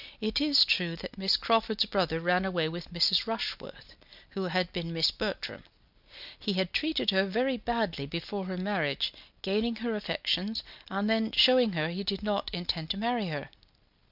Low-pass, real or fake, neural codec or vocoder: 5.4 kHz; real; none